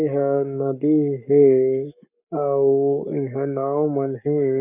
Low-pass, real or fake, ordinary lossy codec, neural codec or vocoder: 3.6 kHz; fake; none; codec, 44.1 kHz, 7.8 kbps, Pupu-Codec